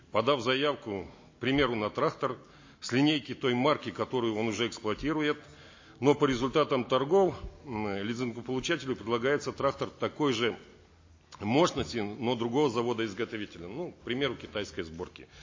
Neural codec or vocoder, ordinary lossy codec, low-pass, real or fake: none; MP3, 32 kbps; 7.2 kHz; real